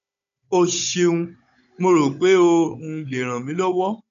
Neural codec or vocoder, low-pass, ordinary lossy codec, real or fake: codec, 16 kHz, 16 kbps, FunCodec, trained on Chinese and English, 50 frames a second; 7.2 kHz; none; fake